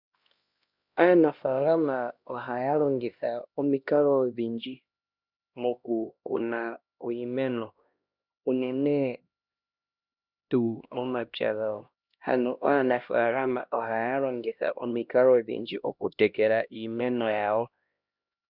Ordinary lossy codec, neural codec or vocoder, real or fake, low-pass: Opus, 64 kbps; codec, 16 kHz, 1 kbps, X-Codec, HuBERT features, trained on LibriSpeech; fake; 5.4 kHz